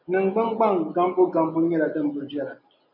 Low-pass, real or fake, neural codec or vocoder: 5.4 kHz; real; none